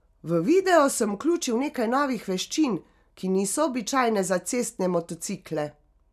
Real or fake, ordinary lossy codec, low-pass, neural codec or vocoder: real; none; 14.4 kHz; none